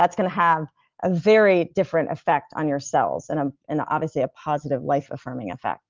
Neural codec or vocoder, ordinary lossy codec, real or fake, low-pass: none; Opus, 32 kbps; real; 7.2 kHz